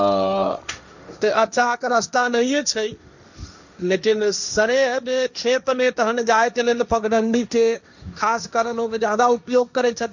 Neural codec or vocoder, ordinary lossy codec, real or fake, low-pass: codec, 16 kHz, 1.1 kbps, Voila-Tokenizer; none; fake; 7.2 kHz